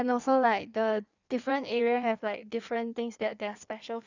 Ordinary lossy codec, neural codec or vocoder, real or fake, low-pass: none; codec, 16 kHz in and 24 kHz out, 1.1 kbps, FireRedTTS-2 codec; fake; 7.2 kHz